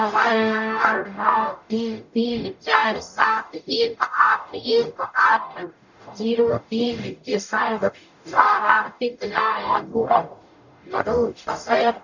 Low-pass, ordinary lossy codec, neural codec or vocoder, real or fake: 7.2 kHz; none; codec, 44.1 kHz, 0.9 kbps, DAC; fake